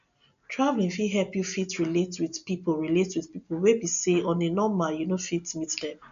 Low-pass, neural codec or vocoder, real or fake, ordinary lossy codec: 7.2 kHz; none; real; MP3, 96 kbps